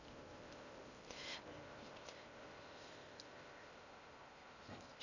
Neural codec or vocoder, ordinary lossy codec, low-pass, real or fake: codec, 16 kHz in and 24 kHz out, 0.6 kbps, FocalCodec, streaming, 4096 codes; none; 7.2 kHz; fake